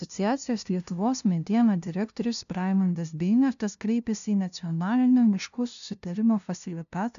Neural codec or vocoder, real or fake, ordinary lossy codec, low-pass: codec, 16 kHz, 0.5 kbps, FunCodec, trained on LibriTTS, 25 frames a second; fake; MP3, 96 kbps; 7.2 kHz